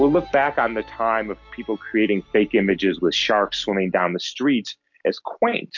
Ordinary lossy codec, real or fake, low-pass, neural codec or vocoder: MP3, 48 kbps; real; 7.2 kHz; none